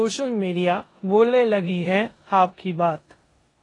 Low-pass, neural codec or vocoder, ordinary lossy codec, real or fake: 10.8 kHz; codec, 16 kHz in and 24 kHz out, 0.9 kbps, LongCat-Audio-Codec, four codebook decoder; AAC, 32 kbps; fake